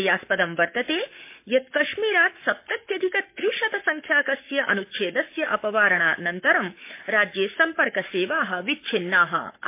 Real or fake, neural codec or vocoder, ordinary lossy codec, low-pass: fake; codec, 44.1 kHz, 7.8 kbps, Pupu-Codec; MP3, 24 kbps; 3.6 kHz